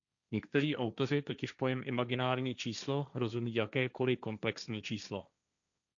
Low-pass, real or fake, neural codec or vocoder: 7.2 kHz; fake; codec, 16 kHz, 1.1 kbps, Voila-Tokenizer